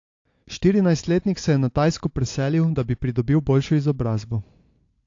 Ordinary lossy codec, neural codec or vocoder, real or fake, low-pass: AAC, 48 kbps; none; real; 7.2 kHz